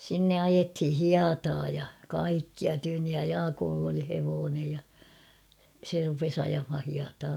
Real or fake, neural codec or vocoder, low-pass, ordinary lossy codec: fake; codec, 44.1 kHz, 7.8 kbps, DAC; 19.8 kHz; none